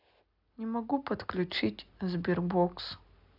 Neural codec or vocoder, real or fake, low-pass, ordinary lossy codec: none; real; 5.4 kHz; AAC, 48 kbps